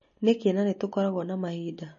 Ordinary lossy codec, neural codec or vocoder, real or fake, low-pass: MP3, 32 kbps; none; real; 7.2 kHz